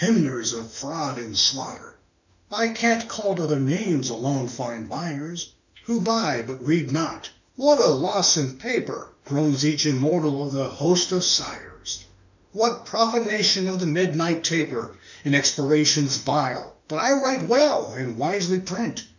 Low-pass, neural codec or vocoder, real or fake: 7.2 kHz; autoencoder, 48 kHz, 32 numbers a frame, DAC-VAE, trained on Japanese speech; fake